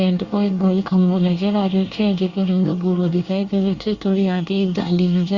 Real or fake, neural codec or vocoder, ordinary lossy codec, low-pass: fake; codec, 24 kHz, 1 kbps, SNAC; Opus, 64 kbps; 7.2 kHz